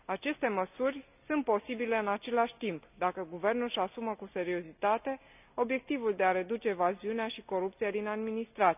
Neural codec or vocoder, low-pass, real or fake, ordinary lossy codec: none; 3.6 kHz; real; none